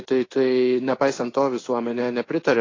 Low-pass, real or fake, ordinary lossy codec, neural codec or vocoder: 7.2 kHz; fake; AAC, 32 kbps; codec, 16 kHz in and 24 kHz out, 1 kbps, XY-Tokenizer